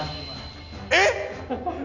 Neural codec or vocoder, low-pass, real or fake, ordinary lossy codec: none; 7.2 kHz; real; none